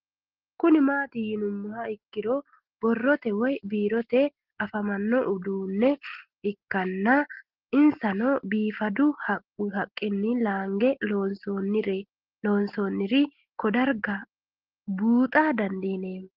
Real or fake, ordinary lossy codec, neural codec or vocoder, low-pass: real; Opus, 16 kbps; none; 5.4 kHz